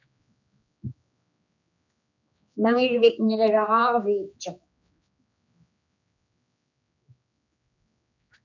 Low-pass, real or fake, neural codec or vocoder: 7.2 kHz; fake; codec, 16 kHz, 2 kbps, X-Codec, HuBERT features, trained on general audio